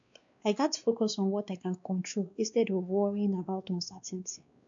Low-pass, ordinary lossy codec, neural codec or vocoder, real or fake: 7.2 kHz; MP3, 64 kbps; codec, 16 kHz, 2 kbps, X-Codec, WavLM features, trained on Multilingual LibriSpeech; fake